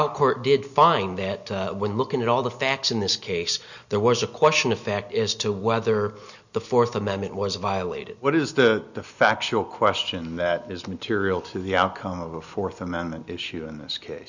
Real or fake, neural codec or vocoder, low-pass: real; none; 7.2 kHz